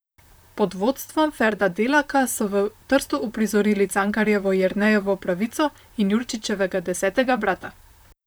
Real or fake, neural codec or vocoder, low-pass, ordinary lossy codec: fake; vocoder, 44.1 kHz, 128 mel bands, Pupu-Vocoder; none; none